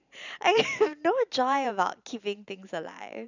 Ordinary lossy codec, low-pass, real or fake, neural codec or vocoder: none; 7.2 kHz; fake; vocoder, 44.1 kHz, 128 mel bands every 512 samples, BigVGAN v2